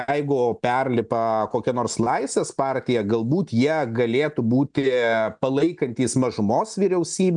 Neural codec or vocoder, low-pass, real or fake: none; 9.9 kHz; real